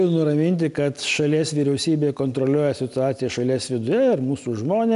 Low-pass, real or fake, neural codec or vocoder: 10.8 kHz; real; none